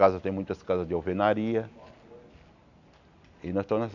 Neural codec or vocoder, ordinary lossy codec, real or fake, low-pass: none; none; real; 7.2 kHz